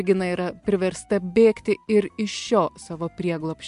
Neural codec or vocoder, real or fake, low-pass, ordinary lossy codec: none; real; 10.8 kHz; MP3, 64 kbps